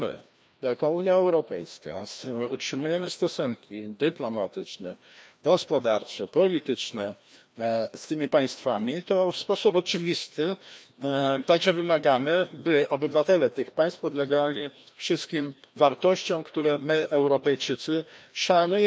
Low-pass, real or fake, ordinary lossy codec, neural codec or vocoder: none; fake; none; codec, 16 kHz, 1 kbps, FreqCodec, larger model